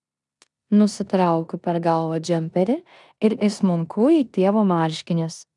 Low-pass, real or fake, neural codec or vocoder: 10.8 kHz; fake; codec, 16 kHz in and 24 kHz out, 0.9 kbps, LongCat-Audio-Codec, four codebook decoder